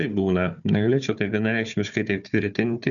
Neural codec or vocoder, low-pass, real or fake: codec, 16 kHz, 4 kbps, FunCodec, trained on Chinese and English, 50 frames a second; 7.2 kHz; fake